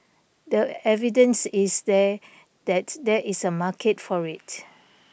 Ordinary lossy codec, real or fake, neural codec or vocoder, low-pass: none; real; none; none